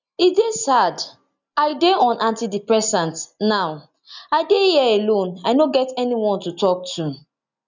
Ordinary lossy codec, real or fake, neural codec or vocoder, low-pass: none; real; none; 7.2 kHz